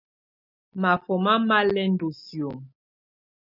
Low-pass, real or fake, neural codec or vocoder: 5.4 kHz; real; none